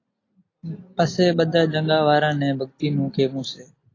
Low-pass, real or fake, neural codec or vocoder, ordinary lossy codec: 7.2 kHz; real; none; AAC, 32 kbps